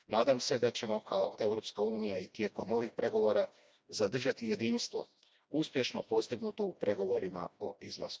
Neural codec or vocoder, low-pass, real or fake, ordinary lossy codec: codec, 16 kHz, 1 kbps, FreqCodec, smaller model; none; fake; none